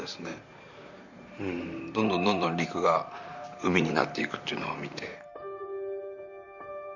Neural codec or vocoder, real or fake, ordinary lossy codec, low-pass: vocoder, 44.1 kHz, 128 mel bands, Pupu-Vocoder; fake; none; 7.2 kHz